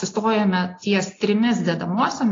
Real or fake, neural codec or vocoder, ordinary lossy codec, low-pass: real; none; AAC, 32 kbps; 7.2 kHz